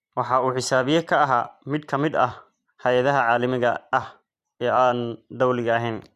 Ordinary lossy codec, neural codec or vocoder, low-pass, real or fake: AAC, 96 kbps; vocoder, 44.1 kHz, 128 mel bands every 256 samples, BigVGAN v2; 14.4 kHz; fake